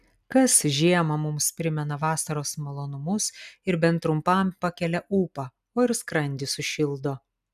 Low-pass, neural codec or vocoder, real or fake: 14.4 kHz; none; real